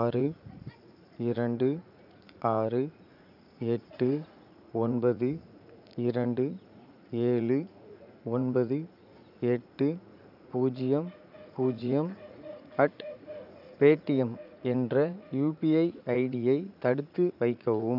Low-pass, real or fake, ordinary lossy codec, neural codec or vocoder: 5.4 kHz; fake; none; vocoder, 44.1 kHz, 80 mel bands, Vocos